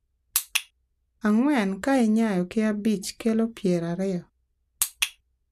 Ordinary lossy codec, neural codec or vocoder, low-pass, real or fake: none; none; 14.4 kHz; real